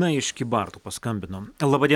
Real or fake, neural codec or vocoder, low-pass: real; none; 19.8 kHz